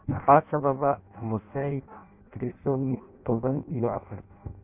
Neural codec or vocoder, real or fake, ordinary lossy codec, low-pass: codec, 16 kHz in and 24 kHz out, 0.6 kbps, FireRedTTS-2 codec; fake; none; 3.6 kHz